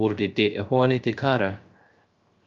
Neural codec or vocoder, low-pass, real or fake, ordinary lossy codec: codec, 16 kHz, 0.3 kbps, FocalCodec; 7.2 kHz; fake; Opus, 24 kbps